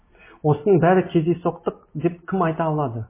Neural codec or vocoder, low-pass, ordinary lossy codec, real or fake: none; 3.6 kHz; MP3, 16 kbps; real